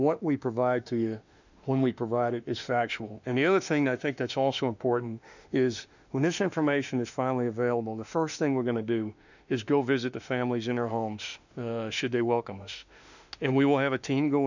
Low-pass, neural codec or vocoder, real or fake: 7.2 kHz; autoencoder, 48 kHz, 32 numbers a frame, DAC-VAE, trained on Japanese speech; fake